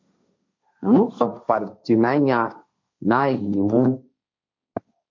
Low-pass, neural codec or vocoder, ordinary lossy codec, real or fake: 7.2 kHz; codec, 16 kHz, 1.1 kbps, Voila-Tokenizer; MP3, 48 kbps; fake